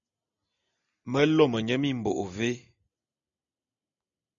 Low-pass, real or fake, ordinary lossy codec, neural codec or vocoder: 7.2 kHz; real; MP3, 64 kbps; none